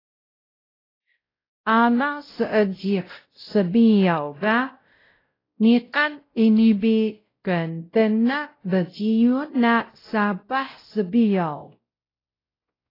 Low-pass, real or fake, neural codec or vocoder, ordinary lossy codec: 5.4 kHz; fake; codec, 16 kHz, 0.5 kbps, X-Codec, WavLM features, trained on Multilingual LibriSpeech; AAC, 24 kbps